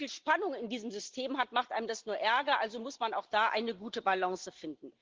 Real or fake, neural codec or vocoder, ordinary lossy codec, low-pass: real; none; Opus, 16 kbps; 7.2 kHz